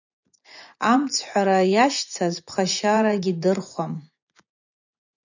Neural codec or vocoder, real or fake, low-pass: vocoder, 24 kHz, 100 mel bands, Vocos; fake; 7.2 kHz